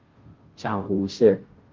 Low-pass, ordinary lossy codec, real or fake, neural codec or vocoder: 7.2 kHz; Opus, 24 kbps; fake; codec, 16 kHz, 0.5 kbps, FunCodec, trained on Chinese and English, 25 frames a second